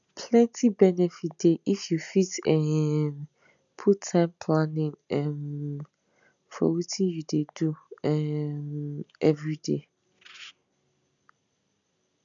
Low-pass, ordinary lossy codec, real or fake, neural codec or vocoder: 7.2 kHz; none; real; none